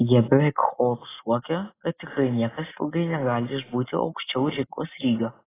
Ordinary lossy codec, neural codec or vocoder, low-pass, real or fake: AAC, 16 kbps; none; 3.6 kHz; real